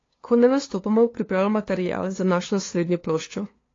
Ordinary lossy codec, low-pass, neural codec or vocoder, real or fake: AAC, 32 kbps; 7.2 kHz; codec, 16 kHz, 2 kbps, FunCodec, trained on LibriTTS, 25 frames a second; fake